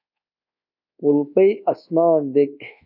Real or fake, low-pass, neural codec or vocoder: fake; 5.4 kHz; codec, 24 kHz, 1.2 kbps, DualCodec